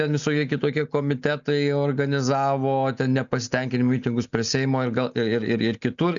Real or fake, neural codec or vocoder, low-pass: real; none; 7.2 kHz